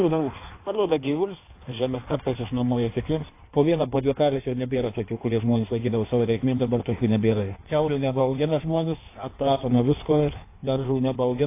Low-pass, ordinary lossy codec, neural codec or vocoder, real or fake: 3.6 kHz; AAC, 24 kbps; codec, 16 kHz in and 24 kHz out, 1.1 kbps, FireRedTTS-2 codec; fake